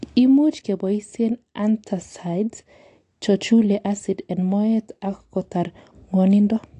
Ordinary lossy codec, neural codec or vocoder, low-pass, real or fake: AAC, 48 kbps; none; 10.8 kHz; real